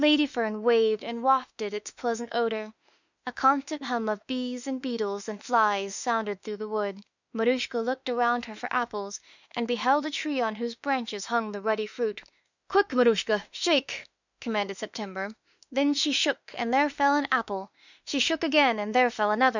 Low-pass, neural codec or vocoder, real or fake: 7.2 kHz; autoencoder, 48 kHz, 32 numbers a frame, DAC-VAE, trained on Japanese speech; fake